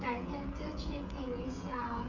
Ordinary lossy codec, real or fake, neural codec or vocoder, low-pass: none; fake; codec, 16 kHz, 16 kbps, FreqCodec, smaller model; 7.2 kHz